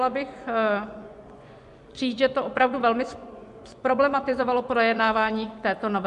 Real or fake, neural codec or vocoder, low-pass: fake; vocoder, 24 kHz, 100 mel bands, Vocos; 10.8 kHz